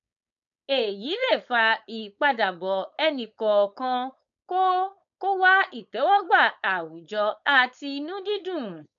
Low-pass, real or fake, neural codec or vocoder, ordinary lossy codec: 7.2 kHz; fake; codec, 16 kHz, 4.8 kbps, FACodec; none